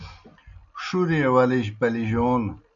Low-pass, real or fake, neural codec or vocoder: 7.2 kHz; real; none